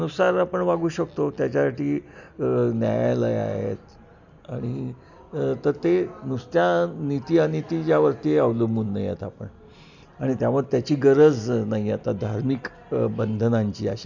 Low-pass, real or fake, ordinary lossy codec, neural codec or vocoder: 7.2 kHz; real; none; none